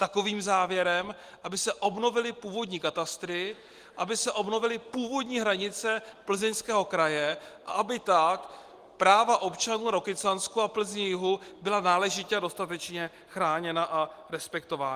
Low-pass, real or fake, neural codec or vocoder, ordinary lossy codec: 14.4 kHz; real; none; Opus, 24 kbps